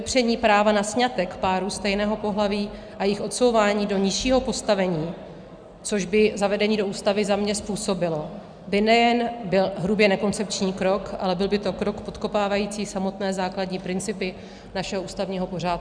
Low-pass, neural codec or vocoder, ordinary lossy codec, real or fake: 9.9 kHz; none; Opus, 64 kbps; real